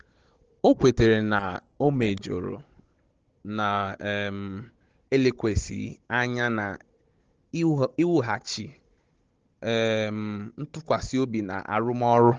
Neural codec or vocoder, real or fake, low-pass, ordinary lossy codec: codec, 16 kHz, 16 kbps, FunCodec, trained on Chinese and English, 50 frames a second; fake; 7.2 kHz; Opus, 16 kbps